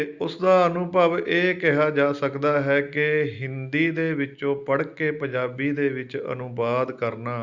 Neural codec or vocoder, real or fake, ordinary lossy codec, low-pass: none; real; Opus, 64 kbps; 7.2 kHz